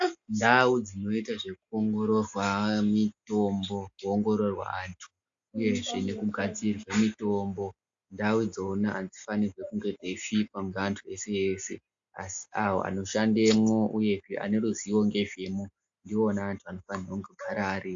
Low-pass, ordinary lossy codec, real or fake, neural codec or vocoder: 7.2 kHz; AAC, 64 kbps; real; none